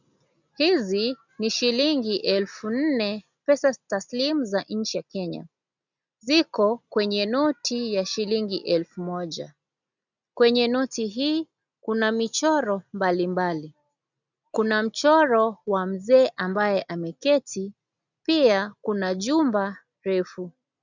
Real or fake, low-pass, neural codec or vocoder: real; 7.2 kHz; none